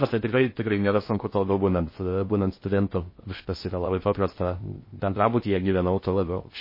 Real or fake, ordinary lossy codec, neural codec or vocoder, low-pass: fake; MP3, 24 kbps; codec, 16 kHz in and 24 kHz out, 0.6 kbps, FocalCodec, streaming, 2048 codes; 5.4 kHz